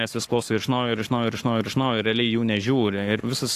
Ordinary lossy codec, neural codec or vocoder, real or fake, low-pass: AAC, 64 kbps; codec, 44.1 kHz, 7.8 kbps, Pupu-Codec; fake; 14.4 kHz